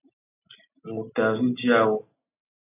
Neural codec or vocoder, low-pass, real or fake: none; 3.6 kHz; real